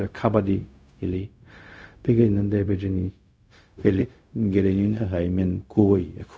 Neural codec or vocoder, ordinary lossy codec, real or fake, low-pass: codec, 16 kHz, 0.4 kbps, LongCat-Audio-Codec; none; fake; none